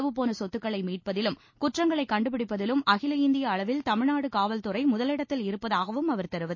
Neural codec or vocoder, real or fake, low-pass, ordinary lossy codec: none; real; 7.2 kHz; MP3, 32 kbps